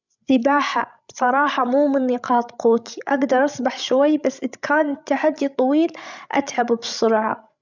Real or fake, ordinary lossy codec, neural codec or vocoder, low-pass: fake; none; codec, 16 kHz, 16 kbps, FreqCodec, larger model; 7.2 kHz